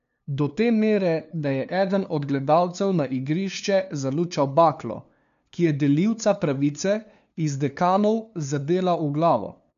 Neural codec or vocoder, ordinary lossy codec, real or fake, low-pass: codec, 16 kHz, 2 kbps, FunCodec, trained on LibriTTS, 25 frames a second; none; fake; 7.2 kHz